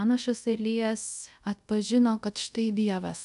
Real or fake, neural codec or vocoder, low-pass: fake; codec, 24 kHz, 0.5 kbps, DualCodec; 10.8 kHz